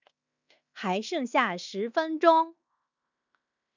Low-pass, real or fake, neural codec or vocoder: 7.2 kHz; fake; codec, 16 kHz in and 24 kHz out, 0.9 kbps, LongCat-Audio-Codec, fine tuned four codebook decoder